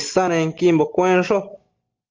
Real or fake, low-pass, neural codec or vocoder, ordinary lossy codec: real; 7.2 kHz; none; Opus, 24 kbps